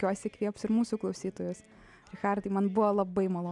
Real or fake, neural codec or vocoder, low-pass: real; none; 10.8 kHz